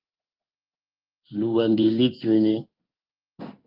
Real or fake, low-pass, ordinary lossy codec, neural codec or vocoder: fake; 5.4 kHz; Opus, 32 kbps; codec, 16 kHz in and 24 kHz out, 1 kbps, XY-Tokenizer